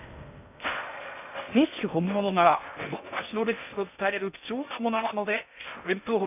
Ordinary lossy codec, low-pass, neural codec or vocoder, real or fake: none; 3.6 kHz; codec, 16 kHz in and 24 kHz out, 0.6 kbps, FocalCodec, streaming, 2048 codes; fake